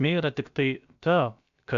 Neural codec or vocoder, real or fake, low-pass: codec, 16 kHz, about 1 kbps, DyCAST, with the encoder's durations; fake; 7.2 kHz